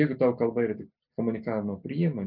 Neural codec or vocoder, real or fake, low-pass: none; real; 5.4 kHz